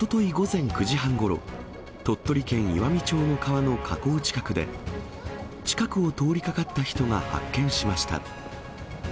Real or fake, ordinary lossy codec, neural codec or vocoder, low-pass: real; none; none; none